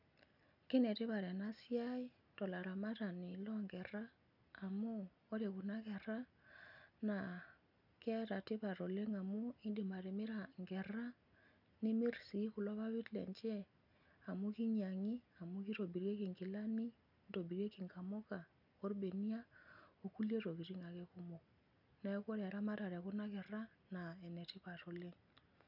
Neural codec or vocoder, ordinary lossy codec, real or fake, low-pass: none; none; real; 5.4 kHz